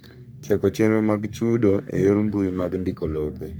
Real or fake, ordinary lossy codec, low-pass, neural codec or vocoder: fake; none; none; codec, 44.1 kHz, 3.4 kbps, Pupu-Codec